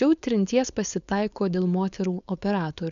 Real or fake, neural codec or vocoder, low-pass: fake; codec, 16 kHz, 4.8 kbps, FACodec; 7.2 kHz